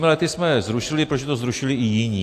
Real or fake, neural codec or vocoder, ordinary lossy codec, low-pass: real; none; AAC, 64 kbps; 14.4 kHz